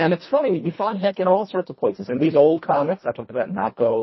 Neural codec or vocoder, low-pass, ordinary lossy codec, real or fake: codec, 24 kHz, 1.5 kbps, HILCodec; 7.2 kHz; MP3, 24 kbps; fake